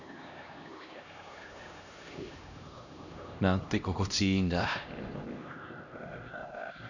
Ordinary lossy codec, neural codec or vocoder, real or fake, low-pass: none; codec, 16 kHz, 1 kbps, X-Codec, HuBERT features, trained on LibriSpeech; fake; 7.2 kHz